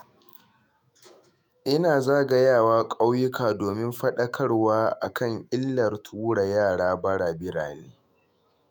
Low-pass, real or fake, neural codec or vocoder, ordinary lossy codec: none; fake; autoencoder, 48 kHz, 128 numbers a frame, DAC-VAE, trained on Japanese speech; none